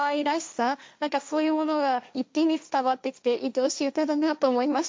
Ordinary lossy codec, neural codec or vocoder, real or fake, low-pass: none; codec, 16 kHz, 1.1 kbps, Voila-Tokenizer; fake; none